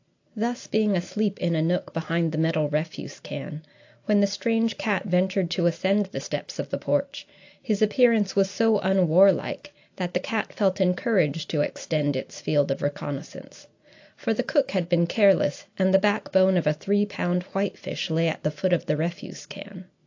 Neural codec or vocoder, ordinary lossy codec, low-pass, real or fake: none; AAC, 48 kbps; 7.2 kHz; real